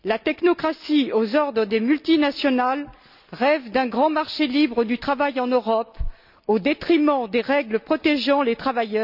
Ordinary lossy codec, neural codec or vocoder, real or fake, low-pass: none; none; real; 5.4 kHz